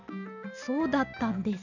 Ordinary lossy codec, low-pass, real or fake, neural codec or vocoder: none; 7.2 kHz; real; none